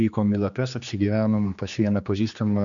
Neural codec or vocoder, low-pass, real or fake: codec, 16 kHz, 2 kbps, X-Codec, HuBERT features, trained on general audio; 7.2 kHz; fake